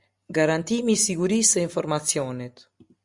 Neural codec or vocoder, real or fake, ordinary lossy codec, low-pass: none; real; Opus, 64 kbps; 10.8 kHz